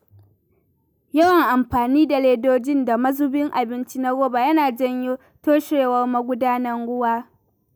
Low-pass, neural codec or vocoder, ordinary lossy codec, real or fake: none; none; none; real